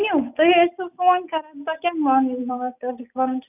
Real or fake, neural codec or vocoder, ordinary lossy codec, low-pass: real; none; none; 3.6 kHz